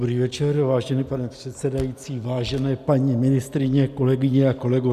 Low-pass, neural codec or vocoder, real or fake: 14.4 kHz; none; real